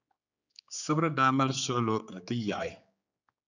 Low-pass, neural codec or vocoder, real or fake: 7.2 kHz; codec, 16 kHz, 4 kbps, X-Codec, HuBERT features, trained on general audio; fake